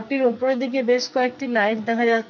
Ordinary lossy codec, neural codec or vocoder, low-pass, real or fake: none; codec, 44.1 kHz, 2.6 kbps, SNAC; 7.2 kHz; fake